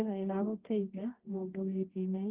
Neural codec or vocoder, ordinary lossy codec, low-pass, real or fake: codec, 24 kHz, 0.9 kbps, WavTokenizer, medium music audio release; Opus, 16 kbps; 3.6 kHz; fake